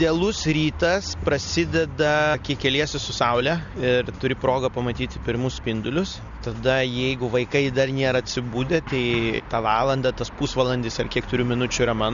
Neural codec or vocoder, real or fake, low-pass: none; real; 7.2 kHz